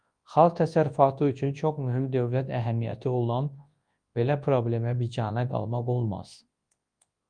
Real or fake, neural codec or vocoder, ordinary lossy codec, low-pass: fake; codec, 24 kHz, 0.9 kbps, WavTokenizer, large speech release; Opus, 32 kbps; 9.9 kHz